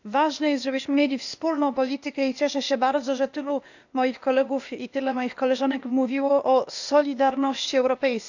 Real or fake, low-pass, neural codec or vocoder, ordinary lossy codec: fake; 7.2 kHz; codec, 16 kHz, 0.8 kbps, ZipCodec; none